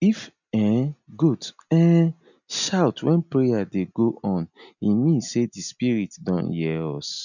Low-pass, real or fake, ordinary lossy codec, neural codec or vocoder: 7.2 kHz; real; none; none